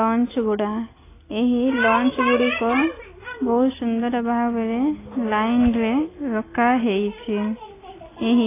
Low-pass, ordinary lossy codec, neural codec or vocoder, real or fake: 3.6 kHz; AAC, 16 kbps; none; real